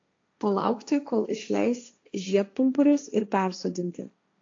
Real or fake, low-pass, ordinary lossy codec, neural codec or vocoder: fake; 7.2 kHz; AAC, 48 kbps; codec, 16 kHz, 1.1 kbps, Voila-Tokenizer